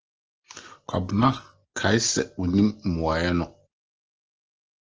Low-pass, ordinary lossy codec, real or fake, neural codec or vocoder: 7.2 kHz; Opus, 16 kbps; real; none